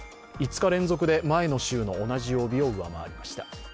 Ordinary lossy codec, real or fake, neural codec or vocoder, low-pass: none; real; none; none